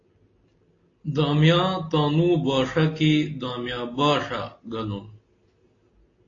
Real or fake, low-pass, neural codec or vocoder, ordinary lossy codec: real; 7.2 kHz; none; AAC, 32 kbps